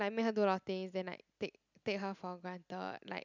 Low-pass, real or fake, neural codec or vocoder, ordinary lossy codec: 7.2 kHz; real; none; none